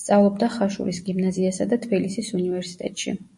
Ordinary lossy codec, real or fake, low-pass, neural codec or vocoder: MP3, 48 kbps; real; 10.8 kHz; none